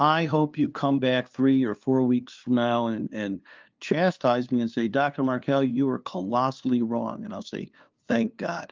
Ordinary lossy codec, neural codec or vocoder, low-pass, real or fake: Opus, 32 kbps; codec, 16 kHz, 2 kbps, FunCodec, trained on LibriTTS, 25 frames a second; 7.2 kHz; fake